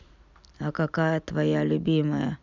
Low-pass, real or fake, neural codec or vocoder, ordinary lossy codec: 7.2 kHz; real; none; none